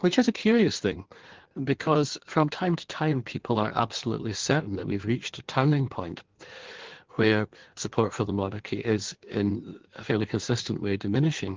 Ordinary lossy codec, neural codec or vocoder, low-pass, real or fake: Opus, 16 kbps; codec, 16 kHz in and 24 kHz out, 1.1 kbps, FireRedTTS-2 codec; 7.2 kHz; fake